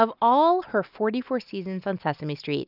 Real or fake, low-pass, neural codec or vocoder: real; 5.4 kHz; none